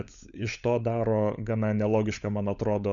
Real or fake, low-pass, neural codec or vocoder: fake; 7.2 kHz; codec, 16 kHz, 16 kbps, FunCodec, trained on LibriTTS, 50 frames a second